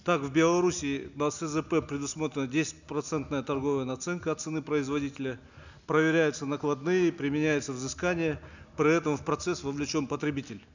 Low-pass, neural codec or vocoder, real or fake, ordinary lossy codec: 7.2 kHz; none; real; none